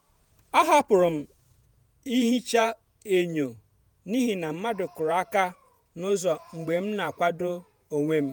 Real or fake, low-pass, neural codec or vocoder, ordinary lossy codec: fake; none; vocoder, 48 kHz, 128 mel bands, Vocos; none